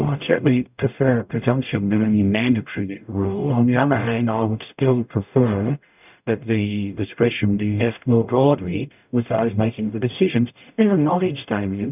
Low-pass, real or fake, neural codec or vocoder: 3.6 kHz; fake; codec, 44.1 kHz, 0.9 kbps, DAC